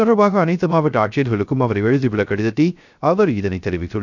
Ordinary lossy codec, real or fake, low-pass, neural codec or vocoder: none; fake; 7.2 kHz; codec, 16 kHz, 0.3 kbps, FocalCodec